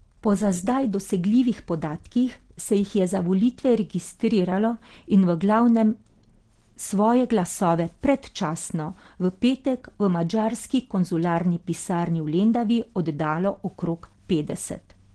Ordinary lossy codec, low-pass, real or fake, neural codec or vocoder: Opus, 16 kbps; 9.9 kHz; real; none